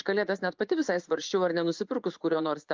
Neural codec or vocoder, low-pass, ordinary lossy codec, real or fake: vocoder, 24 kHz, 100 mel bands, Vocos; 7.2 kHz; Opus, 24 kbps; fake